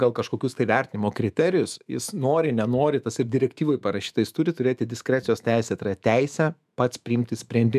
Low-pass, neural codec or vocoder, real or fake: 14.4 kHz; autoencoder, 48 kHz, 128 numbers a frame, DAC-VAE, trained on Japanese speech; fake